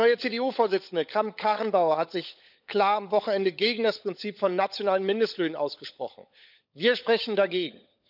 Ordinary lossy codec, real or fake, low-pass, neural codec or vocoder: none; fake; 5.4 kHz; codec, 16 kHz, 16 kbps, FunCodec, trained on LibriTTS, 50 frames a second